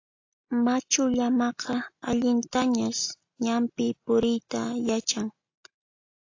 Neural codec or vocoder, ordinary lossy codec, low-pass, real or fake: none; AAC, 48 kbps; 7.2 kHz; real